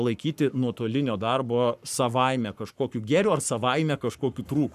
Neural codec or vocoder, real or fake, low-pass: codec, 44.1 kHz, 7.8 kbps, Pupu-Codec; fake; 14.4 kHz